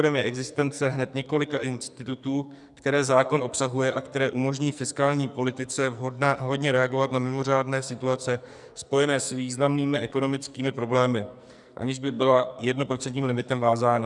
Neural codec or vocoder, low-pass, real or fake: codec, 44.1 kHz, 2.6 kbps, SNAC; 10.8 kHz; fake